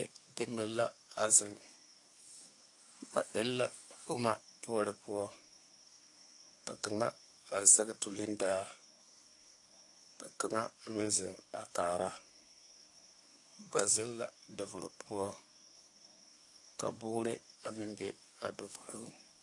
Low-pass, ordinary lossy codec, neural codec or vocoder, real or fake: 10.8 kHz; AAC, 48 kbps; codec, 24 kHz, 1 kbps, SNAC; fake